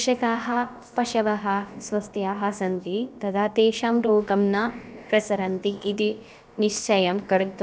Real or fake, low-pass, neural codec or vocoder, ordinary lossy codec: fake; none; codec, 16 kHz, about 1 kbps, DyCAST, with the encoder's durations; none